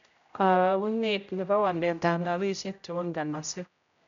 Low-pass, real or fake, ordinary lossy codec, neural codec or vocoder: 7.2 kHz; fake; none; codec, 16 kHz, 0.5 kbps, X-Codec, HuBERT features, trained on general audio